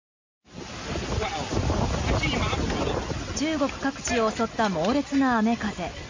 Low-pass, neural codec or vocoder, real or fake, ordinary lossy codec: 7.2 kHz; none; real; MP3, 64 kbps